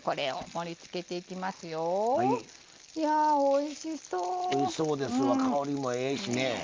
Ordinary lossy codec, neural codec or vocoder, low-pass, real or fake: Opus, 32 kbps; none; 7.2 kHz; real